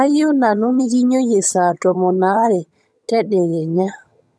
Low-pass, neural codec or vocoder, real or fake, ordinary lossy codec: none; vocoder, 22.05 kHz, 80 mel bands, HiFi-GAN; fake; none